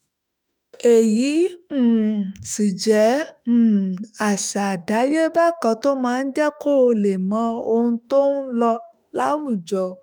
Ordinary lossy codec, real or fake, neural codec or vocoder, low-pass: none; fake; autoencoder, 48 kHz, 32 numbers a frame, DAC-VAE, trained on Japanese speech; none